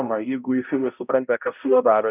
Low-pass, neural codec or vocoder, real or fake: 3.6 kHz; codec, 16 kHz, 0.5 kbps, X-Codec, HuBERT features, trained on balanced general audio; fake